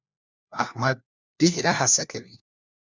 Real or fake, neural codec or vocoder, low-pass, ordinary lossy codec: fake; codec, 16 kHz, 1 kbps, FunCodec, trained on LibriTTS, 50 frames a second; 7.2 kHz; Opus, 64 kbps